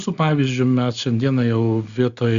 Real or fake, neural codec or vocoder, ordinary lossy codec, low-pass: real; none; Opus, 64 kbps; 7.2 kHz